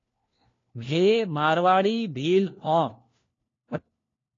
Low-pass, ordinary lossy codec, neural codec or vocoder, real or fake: 7.2 kHz; AAC, 32 kbps; codec, 16 kHz, 1 kbps, FunCodec, trained on LibriTTS, 50 frames a second; fake